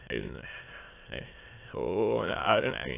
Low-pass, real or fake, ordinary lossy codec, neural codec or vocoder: 3.6 kHz; fake; AAC, 24 kbps; autoencoder, 22.05 kHz, a latent of 192 numbers a frame, VITS, trained on many speakers